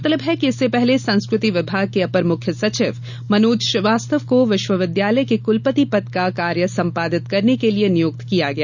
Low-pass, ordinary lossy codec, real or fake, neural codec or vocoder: 7.2 kHz; none; real; none